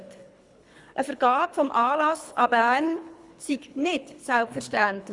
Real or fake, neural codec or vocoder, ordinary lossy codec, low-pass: fake; codec, 24 kHz, 3 kbps, HILCodec; Opus, 64 kbps; 10.8 kHz